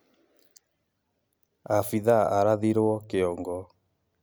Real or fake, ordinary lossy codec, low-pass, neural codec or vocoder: real; none; none; none